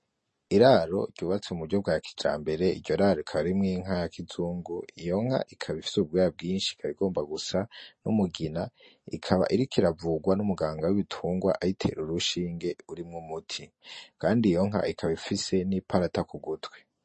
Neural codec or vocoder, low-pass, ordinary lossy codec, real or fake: none; 10.8 kHz; MP3, 32 kbps; real